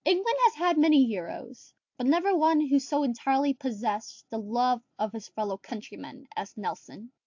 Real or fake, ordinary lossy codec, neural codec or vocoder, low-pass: real; AAC, 48 kbps; none; 7.2 kHz